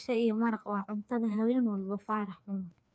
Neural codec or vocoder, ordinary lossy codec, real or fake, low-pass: codec, 16 kHz, 2 kbps, FreqCodec, larger model; none; fake; none